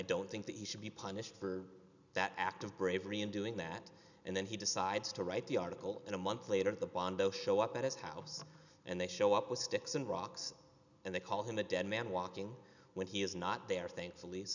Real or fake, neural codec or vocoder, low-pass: real; none; 7.2 kHz